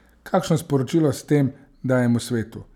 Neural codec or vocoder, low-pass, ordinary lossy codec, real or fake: none; 19.8 kHz; none; real